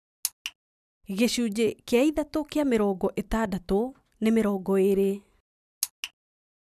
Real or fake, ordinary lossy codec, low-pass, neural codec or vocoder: real; none; 14.4 kHz; none